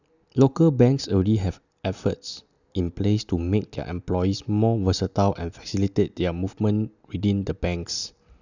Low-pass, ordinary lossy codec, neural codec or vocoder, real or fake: 7.2 kHz; none; none; real